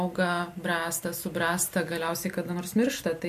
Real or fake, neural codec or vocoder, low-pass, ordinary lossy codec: real; none; 14.4 kHz; MP3, 64 kbps